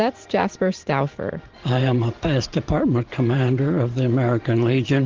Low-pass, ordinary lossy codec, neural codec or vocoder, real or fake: 7.2 kHz; Opus, 16 kbps; vocoder, 44.1 kHz, 128 mel bands every 512 samples, BigVGAN v2; fake